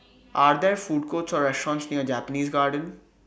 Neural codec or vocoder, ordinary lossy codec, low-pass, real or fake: none; none; none; real